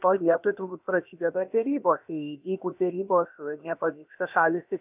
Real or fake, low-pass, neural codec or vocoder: fake; 3.6 kHz; codec, 16 kHz, about 1 kbps, DyCAST, with the encoder's durations